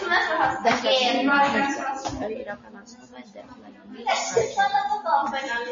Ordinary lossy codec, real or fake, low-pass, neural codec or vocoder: MP3, 32 kbps; real; 7.2 kHz; none